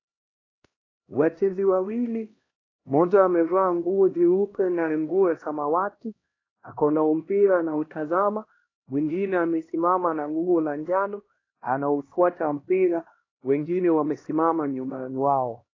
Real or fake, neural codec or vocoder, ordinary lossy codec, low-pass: fake; codec, 16 kHz, 1 kbps, X-Codec, HuBERT features, trained on LibriSpeech; AAC, 32 kbps; 7.2 kHz